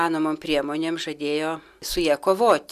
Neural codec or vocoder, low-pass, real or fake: none; 14.4 kHz; real